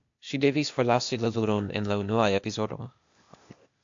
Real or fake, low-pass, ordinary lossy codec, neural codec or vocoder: fake; 7.2 kHz; AAC, 64 kbps; codec, 16 kHz, 0.8 kbps, ZipCodec